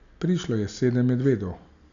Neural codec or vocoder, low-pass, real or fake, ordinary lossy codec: none; 7.2 kHz; real; none